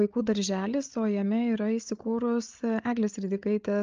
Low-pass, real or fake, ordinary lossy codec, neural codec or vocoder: 7.2 kHz; fake; Opus, 24 kbps; codec, 16 kHz, 8 kbps, FreqCodec, larger model